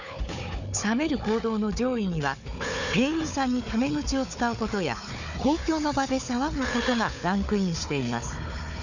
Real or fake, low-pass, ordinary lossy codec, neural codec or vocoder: fake; 7.2 kHz; none; codec, 16 kHz, 4 kbps, FunCodec, trained on Chinese and English, 50 frames a second